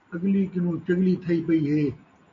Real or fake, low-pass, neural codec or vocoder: real; 7.2 kHz; none